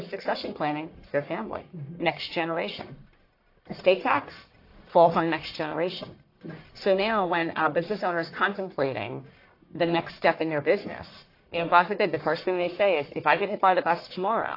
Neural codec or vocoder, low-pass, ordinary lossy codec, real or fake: codec, 44.1 kHz, 1.7 kbps, Pupu-Codec; 5.4 kHz; AAC, 32 kbps; fake